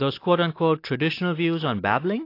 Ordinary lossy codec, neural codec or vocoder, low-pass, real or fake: AAC, 32 kbps; none; 5.4 kHz; real